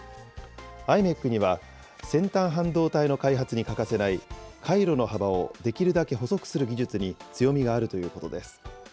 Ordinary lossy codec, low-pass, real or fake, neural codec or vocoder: none; none; real; none